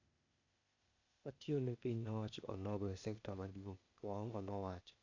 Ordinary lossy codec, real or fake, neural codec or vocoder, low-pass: none; fake; codec, 16 kHz, 0.8 kbps, ZipCodec; 7.2 kHz